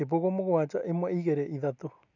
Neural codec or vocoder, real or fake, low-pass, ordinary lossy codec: none; real; 7.2 kHz; none